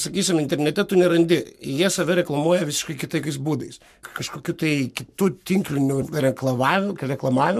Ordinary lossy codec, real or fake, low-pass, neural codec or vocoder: MP3, 96 kbps; fake; 14.4 kHz; codec, 44.1 kHz, 7.8 kbps, Pupu-Codec